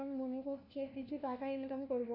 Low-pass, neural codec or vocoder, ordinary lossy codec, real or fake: 5.4 kHz; codec, 16 kHz, 1 kbps, FunCodec, trained on LibriTTS, 50 frames a second; none; fake